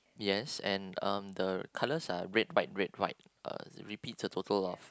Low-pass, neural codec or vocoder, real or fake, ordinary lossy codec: none; none; real; none